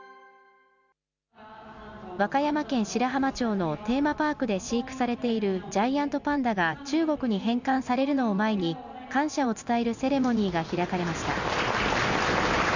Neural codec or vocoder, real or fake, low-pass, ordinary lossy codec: none; real; 7.2 kHz; none